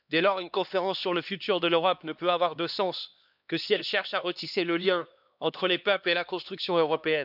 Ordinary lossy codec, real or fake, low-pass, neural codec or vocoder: none; fake; 5.4 kHz; codec, 16 kHz, 2 kbps, X-Codec, HuBERT features, trained on LibriSpeech